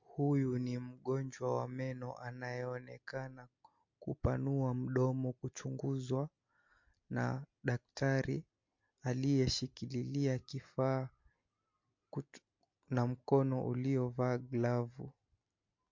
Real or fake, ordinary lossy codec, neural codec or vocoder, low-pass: real; MP3, 48 kbps; none; 7.2 kHz